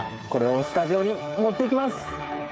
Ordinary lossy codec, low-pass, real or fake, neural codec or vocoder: none; none; fake; codec, 16 kHz, 8 kbps, FreqCodec, smaller model